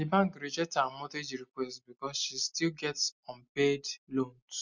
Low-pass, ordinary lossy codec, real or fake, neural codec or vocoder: 7.2 kHz; none; real; none